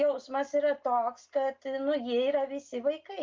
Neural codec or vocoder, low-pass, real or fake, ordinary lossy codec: none; 7.2 kHz; real; Opus, 24 kbps